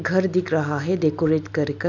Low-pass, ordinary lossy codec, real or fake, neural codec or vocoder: 7.2 kHz; MP3, 64 kbps; real; none